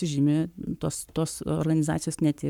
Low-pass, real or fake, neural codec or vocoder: 19.8 kHz; fake; codec, 44.1 kHz, 7.8 kbps, Pupu-Codec